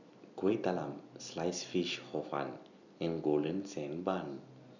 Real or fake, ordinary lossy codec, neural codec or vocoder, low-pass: real; none; none; 7.2 kHz